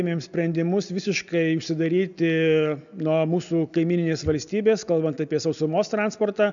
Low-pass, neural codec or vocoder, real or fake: 7.2 kHz; none; real